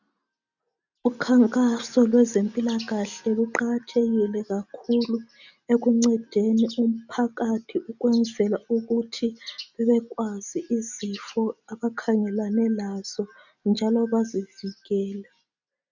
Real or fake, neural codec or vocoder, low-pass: real; none; 7.2 kHz